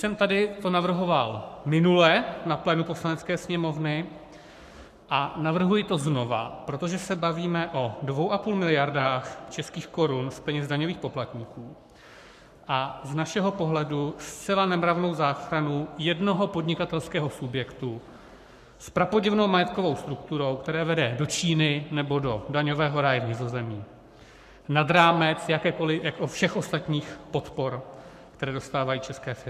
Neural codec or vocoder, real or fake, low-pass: codec, 44.1 kHz, 7.8 kbps, Pupu-Codec; fake; 14.4 kHz